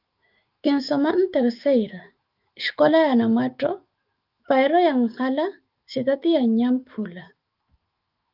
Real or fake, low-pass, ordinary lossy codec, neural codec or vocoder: fake; 5.4 kHz; Opus, 24 kbps; autoencoder, 48 kHz, 128 numbers a frame, DAC-VAE, trained on Japanese speech